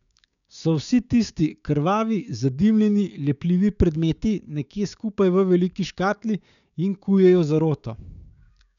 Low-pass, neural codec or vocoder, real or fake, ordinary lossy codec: 7.2 kHz; codec, 16 kHz, 6 kbps, DAC; fake; none